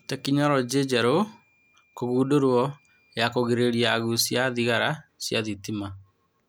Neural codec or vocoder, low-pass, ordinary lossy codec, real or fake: none; none; none; real